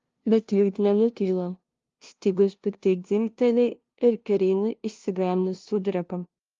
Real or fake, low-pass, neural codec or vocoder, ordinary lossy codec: fake; 7.2 kHz; codec, 16 kHz, 0.5 kbps, FunCodec, trained on LibriTTS, 25 frames a second; Opus, 32 kbps